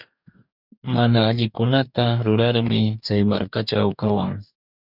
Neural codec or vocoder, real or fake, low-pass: codec, 44.1 kHz, 2.6 kbps, DAC; fake; 5.4 kHz